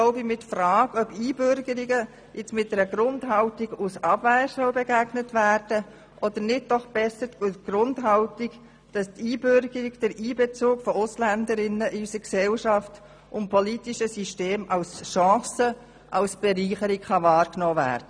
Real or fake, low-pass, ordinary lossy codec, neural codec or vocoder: real; 9.9 kHz; none; none